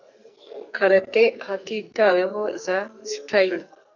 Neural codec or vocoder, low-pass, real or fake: codec, 44.1 kHz, 2.6 kbps, SNAC; 7.2 kHz; fake